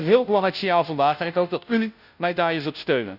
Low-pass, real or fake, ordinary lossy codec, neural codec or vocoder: 5.4 kHz; fake; none; codec, 16 kHz, 0.5 kbps, FunCodec, trained on Chinese and English, 25 frames a second